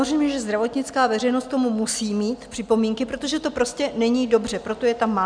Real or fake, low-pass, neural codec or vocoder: real; 9.9 kHz; none